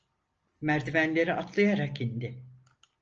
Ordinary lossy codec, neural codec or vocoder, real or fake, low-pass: Opus, 24 kbps; none; real; 7.2 kHz